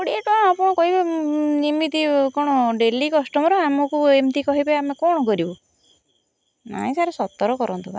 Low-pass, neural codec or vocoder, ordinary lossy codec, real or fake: none; none; none; real